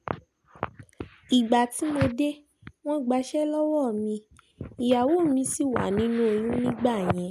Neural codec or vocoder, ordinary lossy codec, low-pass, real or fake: none; none; 14.4 kHz; real